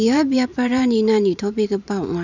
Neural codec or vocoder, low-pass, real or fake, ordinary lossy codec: vocoder, 44.1 kHz, 128 mel bands every 512 samples, BigVGAN v2; 7.2 kHz; fake; none